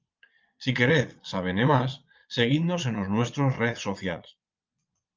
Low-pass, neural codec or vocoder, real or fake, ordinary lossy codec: 7.2 kHz; vocoder, 22.05 kHz, 80 mel bands, Vocos; fake; Opus, 24 kbps